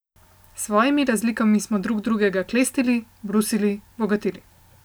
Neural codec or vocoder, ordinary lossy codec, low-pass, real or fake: none; none; none; real